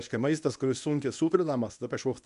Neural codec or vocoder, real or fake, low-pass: codec, 24 kHz, 0.9 kbps, WavTokenizer, medium speech release version 1; fake; 10.8 kHz